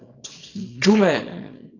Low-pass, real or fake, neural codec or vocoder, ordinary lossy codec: 7.2 kHz; fake; codec, 24 kHz, 0.9 kbps, WavTokenizer, small release; AAC, 32 kbps